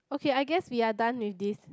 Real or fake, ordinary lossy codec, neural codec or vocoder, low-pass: real; none; none; none